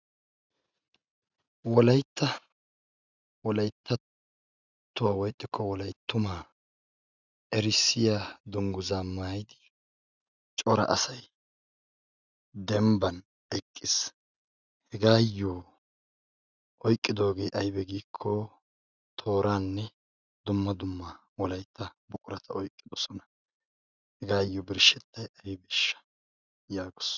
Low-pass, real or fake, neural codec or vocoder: 7.2 kHz; real; none